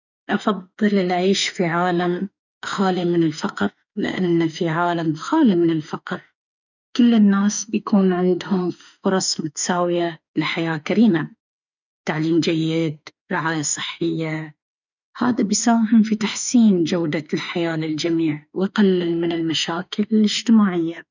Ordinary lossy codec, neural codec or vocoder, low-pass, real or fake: none; autoencoder, 48 kHz, 32 numbers a frame, DAC-VAE, trained on Japanese speech; 7.2 kHz; fake